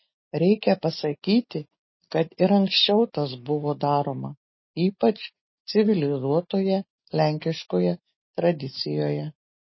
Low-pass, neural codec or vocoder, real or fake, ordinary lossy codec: 7.2 kHz; none; real; MP3, 24 kbps